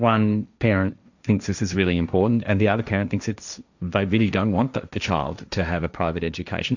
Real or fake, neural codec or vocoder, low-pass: fake; codec, 16 kHz, 1.1 kbps, Voila-Tokenizer; 7.2 kHz